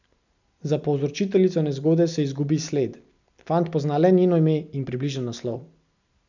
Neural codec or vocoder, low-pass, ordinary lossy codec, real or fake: none; 7.2 kHz; none; real